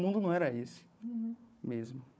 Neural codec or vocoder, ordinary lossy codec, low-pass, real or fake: codec, 16 kHz, 4 kbps, FunCodec, trained on Chinese and English, 50 frames a second; none; none; fake